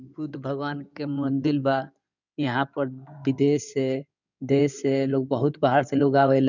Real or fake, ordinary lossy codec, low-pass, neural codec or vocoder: fake; none; 7.2 kHz; codec, 16 kHz in and 24 kHz out, 2.2 kbps, FireRedTTS-2 codec